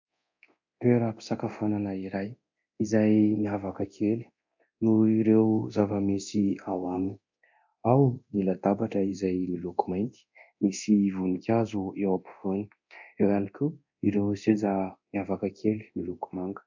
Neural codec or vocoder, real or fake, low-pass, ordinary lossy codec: codec, 24 kHz, 0.9 kbps, DualCodec; fake; 7.2 kHz; MP3, 64 kbps